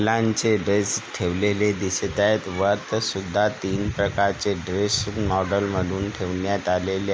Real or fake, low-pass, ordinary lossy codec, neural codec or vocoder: real; none; none; none